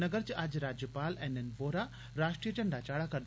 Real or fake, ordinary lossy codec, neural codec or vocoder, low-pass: real; none; none; none